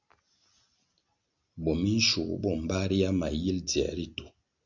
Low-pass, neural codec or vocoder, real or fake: 7.2 kHz; none; real